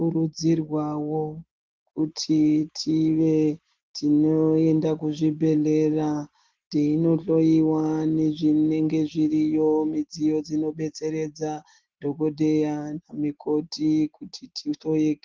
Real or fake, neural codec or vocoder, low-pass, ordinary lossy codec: real; none; 7.2 kHz; Opus, 16 kbps